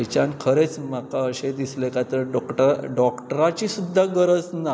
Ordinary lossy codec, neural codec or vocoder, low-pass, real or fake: none; none; none; real